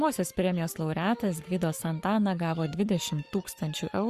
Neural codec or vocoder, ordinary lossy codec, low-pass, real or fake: codec, 44.1 kHz, 7.8 kbps, Pupu-Codec; Opus, 64 kbps; 14.4 kHz; fake